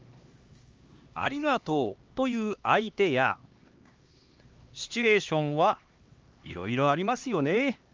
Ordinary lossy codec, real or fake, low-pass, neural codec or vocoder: Opus, 32 kbps; fake; 7.2 kHz; codec, 16 kHz, 2 kbps, X-Codec, HuBERT features, trained on LibriSpeech